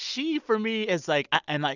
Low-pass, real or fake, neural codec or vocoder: 7.2 kHz; real; none